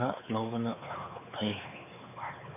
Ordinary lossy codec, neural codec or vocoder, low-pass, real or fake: none; codec, 16 kHz, 4 kbps, X-Codec, WavLM features, trained on Multilingual LibriSpeech; 3.6 kHz; fake